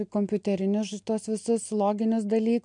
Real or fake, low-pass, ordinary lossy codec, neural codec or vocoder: real; 9.9 kHz; MP3, 64 kbps; none